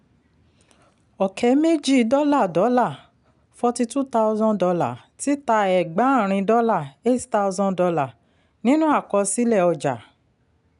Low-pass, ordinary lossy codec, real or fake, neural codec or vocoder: 10.8 kHz; none; real; none